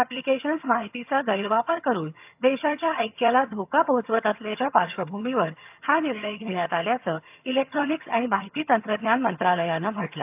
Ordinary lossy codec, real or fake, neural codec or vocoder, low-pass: AAC, 32 kbps; fake; vocoder, 22.05 kHz, 80 mel bands, HiFi-GAN; 3.6 kHz